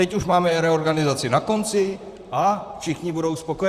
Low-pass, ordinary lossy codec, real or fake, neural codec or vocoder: 14.4 kHz; Opus, 64 kbps; fake; vocoder, 44.1 kHz, 128 mel bands, Pupu-Vocoder